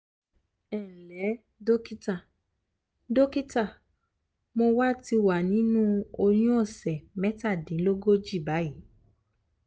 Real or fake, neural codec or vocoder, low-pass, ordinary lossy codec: real; none; none; none